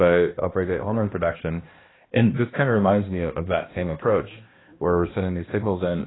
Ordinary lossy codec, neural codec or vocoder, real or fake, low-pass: AAC, 16 kbps; codec, 16 kHz, 1 kbps, X-Codec, HuBERT features, trained on balanced general audio; fake; 7.2 kHz